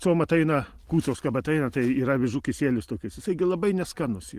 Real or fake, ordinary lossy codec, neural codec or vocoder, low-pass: real; Opus, 24 kbps; none; 14.4 kHz